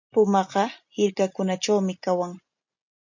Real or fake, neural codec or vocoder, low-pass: real; none; 7.2 kHz